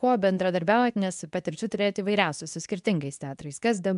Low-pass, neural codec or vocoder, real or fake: 10.8 kHz; codec, 24 kHz, 0.9 kbps, WavTokenizer, medium speech release version 2; fake